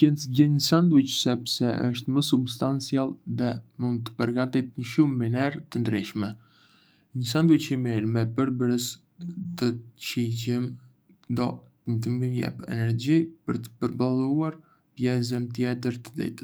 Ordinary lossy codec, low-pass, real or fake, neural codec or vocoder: none; none; fake; codec, 44.1 kHz, 7.8 kbps, DAC